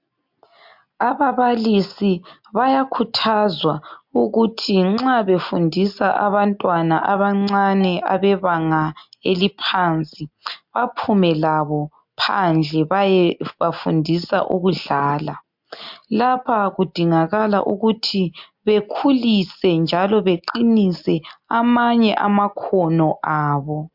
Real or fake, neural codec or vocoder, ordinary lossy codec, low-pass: real; none; MP3, 48 kbps; 5.4 kHz